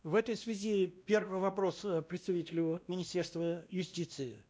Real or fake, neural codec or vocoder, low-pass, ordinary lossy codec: fake; codec, 16 kHz, 1 kbps, X-Codec, WavLM features, trained on Multilingual LibriSpeech; none; none